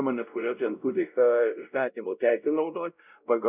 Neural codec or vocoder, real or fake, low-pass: codec, 16 kHz, 0.5 kbps, X-Codec, WavLM features, trained on Multilingual LibriSpeech; fake; 3.6 kHz